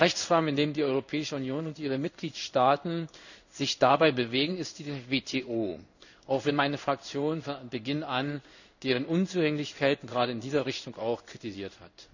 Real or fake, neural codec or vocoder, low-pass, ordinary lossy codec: fake; codec, 16 kHz in and 24 kHz out, 1 kbps, XY-Tokenizer; 7.2 kHz; none